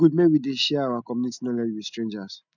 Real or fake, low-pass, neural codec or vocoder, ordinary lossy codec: real; 7.2 kHz; none; none